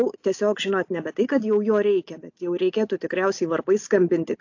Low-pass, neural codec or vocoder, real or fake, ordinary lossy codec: 7.2 kHz; none; real; AAC, 48 kbps